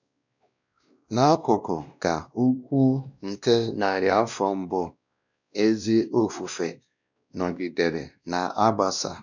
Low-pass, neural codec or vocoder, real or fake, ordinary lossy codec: 7.2 kHz; codec, 16 kHz, 1 kbps, X-Codec, WavLM features, trained on Multilingual LibriSpeech; fake; none